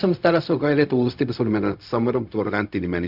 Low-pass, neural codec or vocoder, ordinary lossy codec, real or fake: 5.4 kHz; codec, 16 kHz, 0.4 kbps, LongCat-Audio-Codec; none; fake